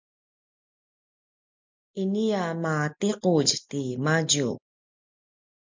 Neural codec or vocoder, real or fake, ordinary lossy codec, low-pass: none; real; MP3, 64 kbps; 7.2 kHz